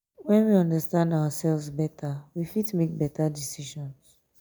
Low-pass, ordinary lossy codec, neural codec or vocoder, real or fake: none; none; none; real